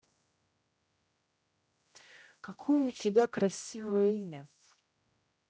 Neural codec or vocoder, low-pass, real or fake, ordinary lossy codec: codec, 16 kHz, 0.5 kbps, X-Codec, HuBERT features, trained on general audio; none; fake; none